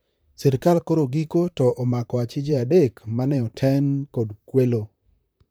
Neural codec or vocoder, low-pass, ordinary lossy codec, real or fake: vocoder, 44.1 kHz, 128 mel bands, Pupu-Vocoder; none; none; fake